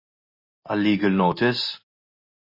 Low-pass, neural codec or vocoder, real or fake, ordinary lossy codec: 5.4 kHz; none; real; MP3, 24 kbps